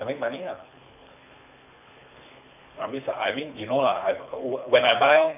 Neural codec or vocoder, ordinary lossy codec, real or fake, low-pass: codec, 24 kHz, 3 kbps, HILCodec; none; fake; 3.6 kHz